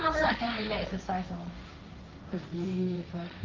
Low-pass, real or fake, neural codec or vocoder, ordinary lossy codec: 7.2 kHz; fake; codec, 16 kHz, 1.1 kbps, Voila-Tokenizer; Opus, 32 kbps